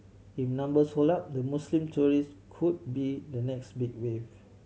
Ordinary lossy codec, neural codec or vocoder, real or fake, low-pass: none; none; real; none